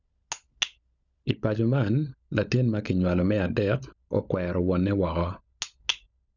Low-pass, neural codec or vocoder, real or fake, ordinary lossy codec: 7.2 kHz; codec, 16 kHz, 16 kbps, FunCodec, trained on LibriTTS, 50 frames a second; fake; none